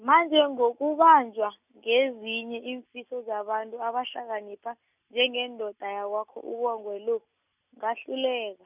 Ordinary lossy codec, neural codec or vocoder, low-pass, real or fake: none; none; 3.6 kHz; real